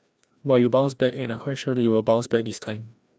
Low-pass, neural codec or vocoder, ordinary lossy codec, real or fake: none; codec, 16 kHz, 1 kbps, FreqCodec, larger model; none; fake